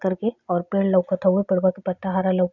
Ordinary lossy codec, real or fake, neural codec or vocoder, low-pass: none; real; none; 7.2 kHz